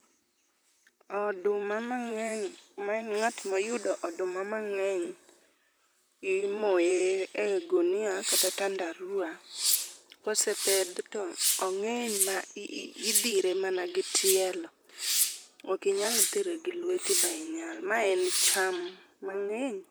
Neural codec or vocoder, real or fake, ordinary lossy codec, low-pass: vocoder, 44.1 kHz, 128 mel bands, Pupu-Vocoder; fake; none; none